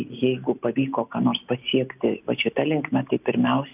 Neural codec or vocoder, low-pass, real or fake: none; 3.6 kHz; real